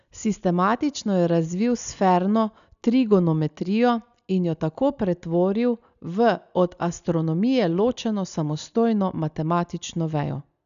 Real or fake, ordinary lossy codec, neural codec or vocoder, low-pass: real; none; none; 7.2 kHz